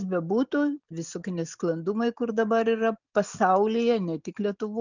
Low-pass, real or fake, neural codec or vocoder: 7.2 kHz; real; none